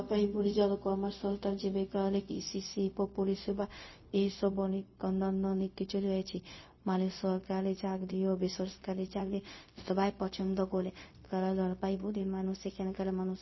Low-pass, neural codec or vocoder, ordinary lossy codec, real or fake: 7.2 kHz; codec, 16 kHz, 0.4 kbps, LongCat-Audio-Codec; MP3, 24 kbps; fake